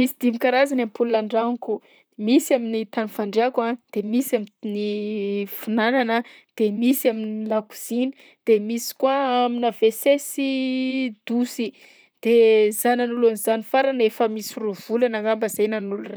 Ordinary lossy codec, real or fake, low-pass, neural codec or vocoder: none; fake; none; vocoder, 44.1 kHz, 128 mel bands, Pupu-Vocoder